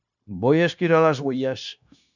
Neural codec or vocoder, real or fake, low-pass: codec, 16 kHz, 0.9 kbps, LongCat-Audio-Codec; fake; 7.2 kHz